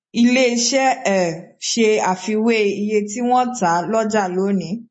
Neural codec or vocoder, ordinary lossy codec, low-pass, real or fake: none; MP3, 32 kbps; 9.9 kHz; real